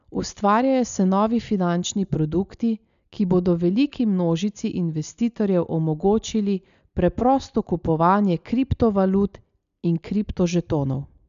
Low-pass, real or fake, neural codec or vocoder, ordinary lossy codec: 7.2 kHz; real; none; none